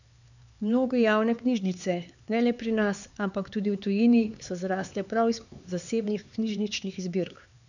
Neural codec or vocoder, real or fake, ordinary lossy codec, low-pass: codec, 16 kHz, 4 kbps, X-Codec, HuBERT features, trained on LibriSpeech; fake; none; 7.2 kHz